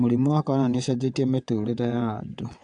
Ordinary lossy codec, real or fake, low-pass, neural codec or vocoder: AAC, 64 kbps; fake; 9.9 kHz; vocoder, 22.05 kHz, 80 mel bands, WaveNeXt